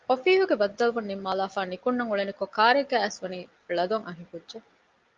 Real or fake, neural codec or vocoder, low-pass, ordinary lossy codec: real; none; 7.2 kHz; Opus, 32 kbps